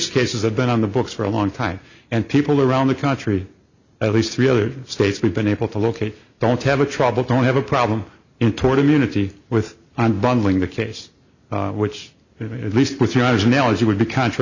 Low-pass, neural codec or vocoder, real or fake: 7.2 kHz; none; real